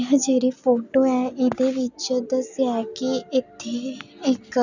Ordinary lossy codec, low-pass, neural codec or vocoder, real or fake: none; 7.2 kHz; none; real